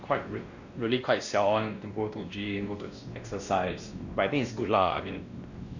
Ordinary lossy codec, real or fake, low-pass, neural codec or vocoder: none; fake; 7.2 kHz; codec, 16 kHz, 1 kbps, X-Codec, WavLM features, trained on Multilingual LibriSpeech